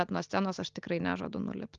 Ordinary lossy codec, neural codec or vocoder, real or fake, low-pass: Opus, 32 kbps; none; real; 7.2 kHz